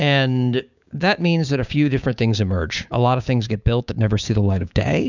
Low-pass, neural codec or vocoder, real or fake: 7.2 kHz; codec, 16 kHz, 6 kbps, DAC; fake